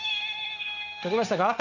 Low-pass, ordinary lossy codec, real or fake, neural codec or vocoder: 7.2 kHz; none; fake; codec, 16 kHz, 0.9 kbps, LongCat-Audio-Codec